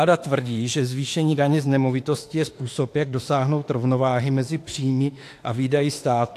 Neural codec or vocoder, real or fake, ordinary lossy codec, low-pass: autoencoder, 48 kHz, 32 numbers a frame, DAC-VAE, trained on Japanese speech; fake; AAC, 64 kbps; 14.4 kHz